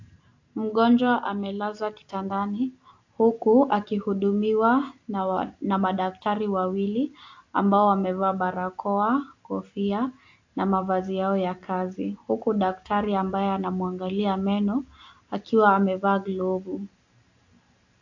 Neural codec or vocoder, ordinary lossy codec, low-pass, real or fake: none; AAC, 48 kbps; 7.2 kHz; real